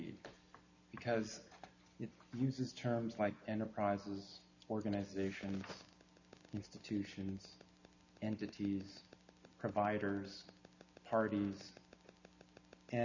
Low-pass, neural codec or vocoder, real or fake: 7.2 kHz; none; real